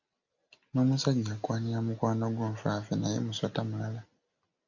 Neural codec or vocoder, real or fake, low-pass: none; real; 7.2 kHz